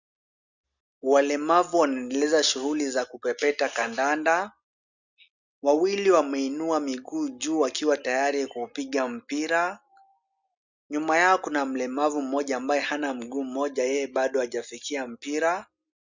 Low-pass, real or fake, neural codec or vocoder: 7.2 kHz; real; none